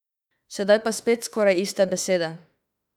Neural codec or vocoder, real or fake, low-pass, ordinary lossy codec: autoencoder, 48 kHz, 32 numbers a frame, DAC-VAE, trained on Japanese speech; fake; 19.8 kHz; none